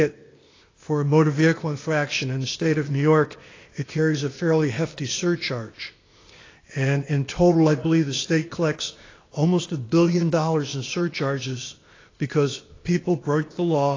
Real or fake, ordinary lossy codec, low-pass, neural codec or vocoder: fake; AAC, 32 kbps; 7.2 kHz; codec, 16 kHz, 0.8 kbps, ZipCodec